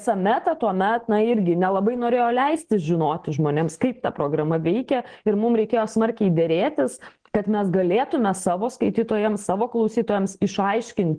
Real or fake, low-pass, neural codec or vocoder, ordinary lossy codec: real; 9.9 kHz; none; Opus, 16 kbps